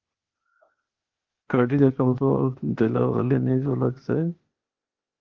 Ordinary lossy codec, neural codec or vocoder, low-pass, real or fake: Opus, 32 kbps; codec, 16 kHz, 0.8 kbps, ZipCodec; 7.2 kHz; fake